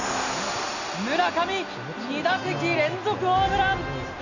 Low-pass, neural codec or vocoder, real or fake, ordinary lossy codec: 7.2 kHz; none; real; Opus, 64 kbps